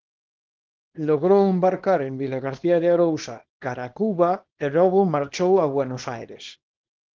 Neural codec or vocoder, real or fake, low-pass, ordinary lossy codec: codec, 24 kHz, 0.9 kbps, WavTokenizer, small release; fake; 7.2 kHz; Opus, 16 kbps